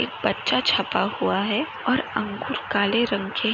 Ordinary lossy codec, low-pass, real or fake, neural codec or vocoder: none; 7.2 kHz; real; none